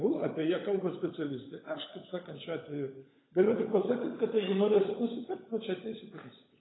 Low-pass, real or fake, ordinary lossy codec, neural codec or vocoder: 7.2 kHz; fake; AAC, 16 kbps; codec, 16 kHz, 16 kbps, FunCodec, trained on LibriTTS, 50 frames a second